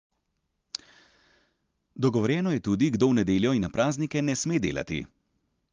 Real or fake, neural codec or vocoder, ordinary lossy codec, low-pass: real; none; Opus, 32 kbps; 7.2 kHz